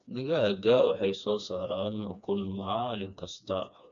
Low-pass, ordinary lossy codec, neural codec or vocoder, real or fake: 7.2 kHz; MP3, 64 kbps; codec, 16 kHz, 2 kbps, FreqCodec, smaller model; fake